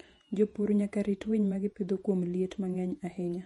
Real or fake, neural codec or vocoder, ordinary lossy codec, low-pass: fake; vocoder, 48 kHz, 128 mel bands, Vocos; MP3, 48 kbps; 19.8 kHz